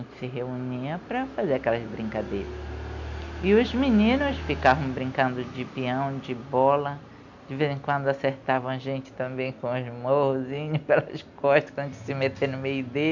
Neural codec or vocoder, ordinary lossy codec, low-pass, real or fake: none; none; 7.2 kHz; real